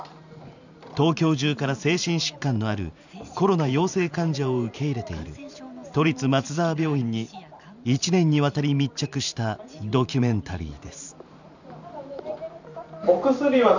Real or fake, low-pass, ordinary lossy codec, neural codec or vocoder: fake; 7.2 kHz; none; vocoder, 44.1 kHz, 128 mel bands every 512 samples, BigVGAN v2